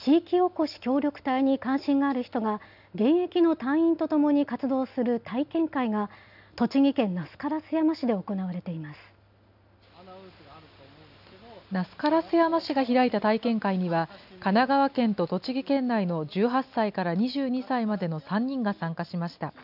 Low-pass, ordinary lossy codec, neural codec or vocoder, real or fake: 5.4 kHz; none; none; real